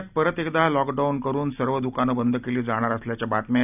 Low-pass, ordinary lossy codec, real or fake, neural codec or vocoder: 3.6 kHz; none; real; none